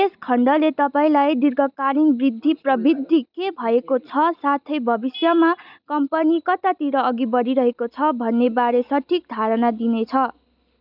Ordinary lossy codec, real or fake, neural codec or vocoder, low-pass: none; real; none; 5.4 kHz